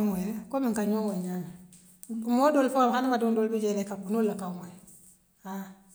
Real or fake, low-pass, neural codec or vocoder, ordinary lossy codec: real; none; none; none